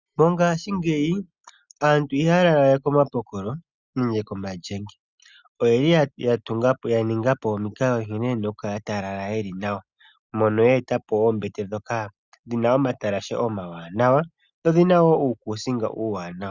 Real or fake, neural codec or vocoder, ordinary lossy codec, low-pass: real; none; Opus, 64 kbps; 7.2 kHz